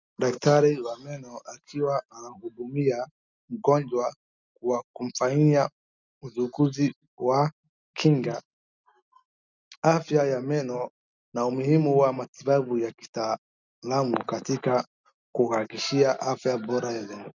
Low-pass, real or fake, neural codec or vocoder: 7.2 kHz; real; none